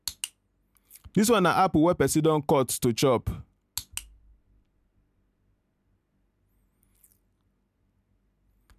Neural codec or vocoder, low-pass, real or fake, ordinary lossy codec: none; 14.4 kHz; real; none